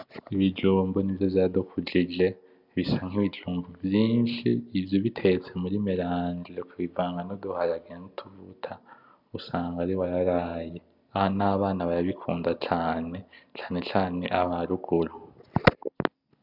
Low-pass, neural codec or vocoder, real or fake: 5.4 kHz; none; real